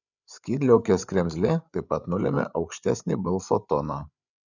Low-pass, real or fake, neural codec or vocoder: 7.2 kHz; fake; codec, 16 kHz, 8 kbps, FreqCodec, larger model